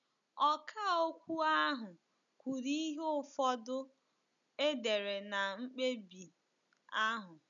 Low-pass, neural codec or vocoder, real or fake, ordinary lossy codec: 7.2 kHz; none; real; none